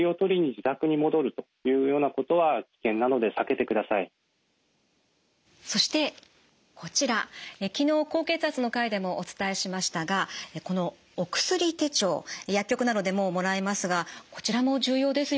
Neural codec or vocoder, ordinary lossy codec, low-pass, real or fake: none; none; none; real